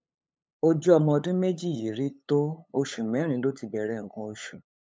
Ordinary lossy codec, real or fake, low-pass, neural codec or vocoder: none; fake; none; codec, 16 kHz, 8 kbps, FunCodec, trained on LibriTTS, 25 frames a second